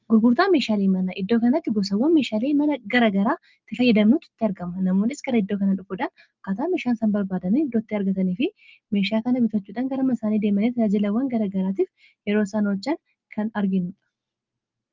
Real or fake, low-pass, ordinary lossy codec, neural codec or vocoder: real; 7.2 kHz; Opus, 32 kbps; none